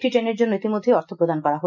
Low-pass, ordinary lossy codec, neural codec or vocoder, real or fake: 7.2 kHz; none; none; real